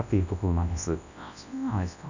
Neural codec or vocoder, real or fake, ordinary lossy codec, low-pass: codec, 24 kHz, 0.9 kbps, WavTokenizer, large speech release; fake; none; 7.2 kHz